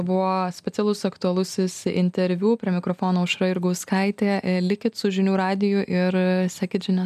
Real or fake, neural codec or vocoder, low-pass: real; none; 14.4 kHz